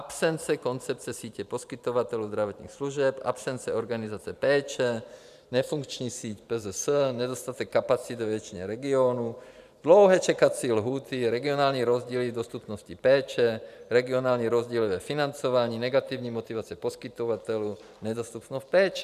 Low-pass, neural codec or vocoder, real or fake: 14.4 kHz; vocoder, 44.1 kHz, 128 mel bands every 512 samples, BigVGAN v2; fake